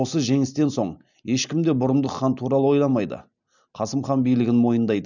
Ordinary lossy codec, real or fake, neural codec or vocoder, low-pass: none; real; none; 7.2 kHz